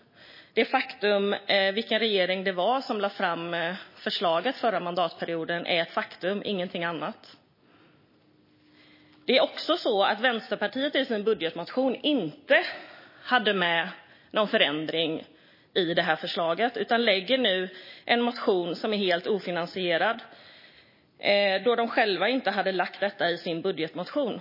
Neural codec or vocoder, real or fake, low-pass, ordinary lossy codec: none; real; 5.4 kHz; MP3, 24 kbps